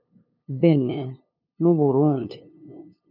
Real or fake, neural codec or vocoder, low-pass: fake; codec, 16 kHz, 2 kbps, FunCodec, trained on LibriTTS, 25 frames a second; 5.4 kHz